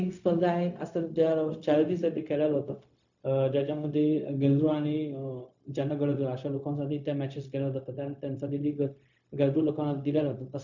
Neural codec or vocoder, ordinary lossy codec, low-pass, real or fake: codec, 16 kHz, 0.4 kbps, LongCat-Audio-Codec; none; 7.2 kHz; fake